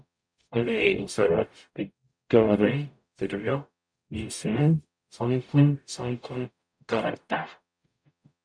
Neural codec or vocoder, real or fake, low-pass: codec, 44.1 kHz, 0.9 kbps, DAC; fake; 9.9 kHz